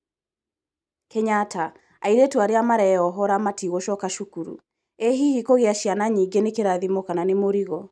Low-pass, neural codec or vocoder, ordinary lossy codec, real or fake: none; none; none; real